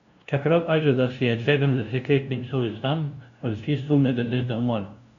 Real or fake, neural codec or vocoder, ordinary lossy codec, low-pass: fake; codec, 16 kHz, 0.5 kbps, FunCodec, trained on LibriTTS, 25 frames a second; none; 7.2 kHz